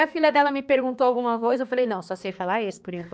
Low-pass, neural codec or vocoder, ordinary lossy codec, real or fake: none; codec, 16 kHz, 2 kbps, X-Codec, HuBERT features, trained on balanced general audio; none; fake